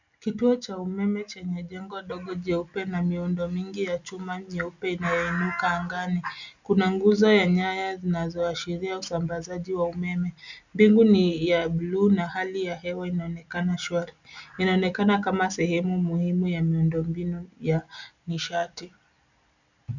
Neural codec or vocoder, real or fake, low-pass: none; real; 7.2 kHz